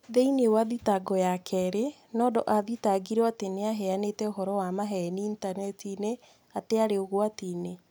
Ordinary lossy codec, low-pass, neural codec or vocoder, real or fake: none; none; none; real